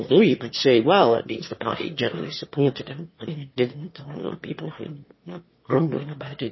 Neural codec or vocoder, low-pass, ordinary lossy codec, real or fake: autoencoder, 22.05 kHz, a latent of 192 numbers a frame, VITS, trained on one speaker; 7.2 kHz; MP3, 24 kbps; fake